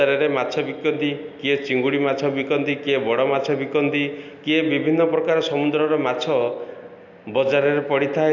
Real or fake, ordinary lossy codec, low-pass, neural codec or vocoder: real; none; 7.2 kHz; none